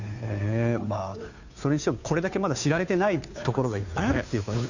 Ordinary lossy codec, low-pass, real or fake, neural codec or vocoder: none; 7.2 kHz; fake; codec, 16 kHz, 2 kbps, FunCodec, trained on Chinese and English, 25 frames a second